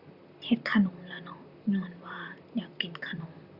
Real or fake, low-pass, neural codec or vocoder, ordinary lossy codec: fake; 5.4 kHz; vocoder, 44.1 kHz, 128 mel bands, Pupu-Vocoder; Opus, 64 kbps